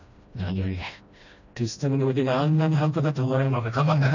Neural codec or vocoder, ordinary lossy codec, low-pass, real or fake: codec, 16 kHz, 1 kbps, FreqCodec, smaller model; none; 7.2 kHz; fake